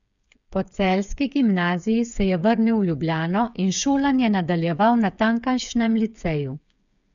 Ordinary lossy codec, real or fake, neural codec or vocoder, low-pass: none; fake; codec, 16 kHz, 8 kbps, FreqCodec, smaller model; 7.2 kHz